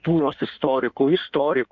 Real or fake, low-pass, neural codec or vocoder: fake; 7.2 kHz; codec, 16 kHz in and 24 kHz out, 1.1 kbps, FireRedTTS-2 codec